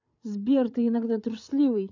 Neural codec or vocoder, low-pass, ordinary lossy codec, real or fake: codec, 16 kHz, 8 kbps, FreqCodec, larger model; 7.2 kHz; none; fake